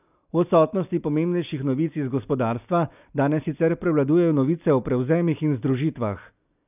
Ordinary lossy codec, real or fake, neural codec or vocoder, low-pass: none; real; none; 3.6 kHz